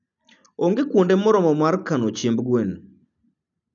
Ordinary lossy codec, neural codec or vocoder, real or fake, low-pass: none; none; real; 7.2 kHz